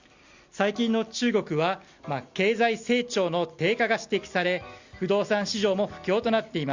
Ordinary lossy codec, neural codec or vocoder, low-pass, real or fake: Opus, 64 kbps; none; 7.2 kHz; real